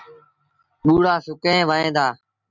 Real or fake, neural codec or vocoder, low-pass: real; none; 7.2 kHz